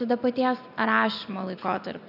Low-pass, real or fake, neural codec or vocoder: 5.4 kHz; real; none